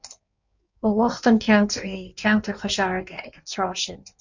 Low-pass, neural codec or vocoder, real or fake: 7.2 kHz; codec, 16 kHz in and 24 kHz out, 1.1 kbps, FireRedTTS-2 codec; fake